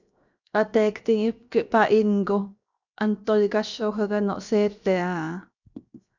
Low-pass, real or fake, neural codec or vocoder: 7.2 kHz; fake; codec, 16 kHz, 0.7 kbps, FocalCodec